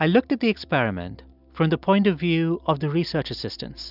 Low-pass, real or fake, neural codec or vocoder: 5.4 kHz; real; none